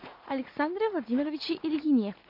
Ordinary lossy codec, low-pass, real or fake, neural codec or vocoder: MP3, 48 kbps; 5.4 kHz; fake; vocoder, 44.1 kHz, 80 mel bands, Vocos